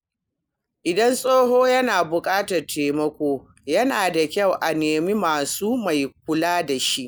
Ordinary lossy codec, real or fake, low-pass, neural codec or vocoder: none; real; none; none